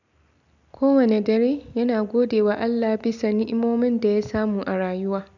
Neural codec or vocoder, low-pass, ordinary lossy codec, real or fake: none; 7.2 kHz; none; real